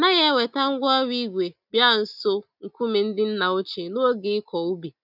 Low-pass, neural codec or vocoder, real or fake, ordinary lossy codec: 5.4 kHz; none; real; none